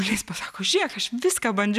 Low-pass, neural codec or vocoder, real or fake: 14.4 kHz; none; real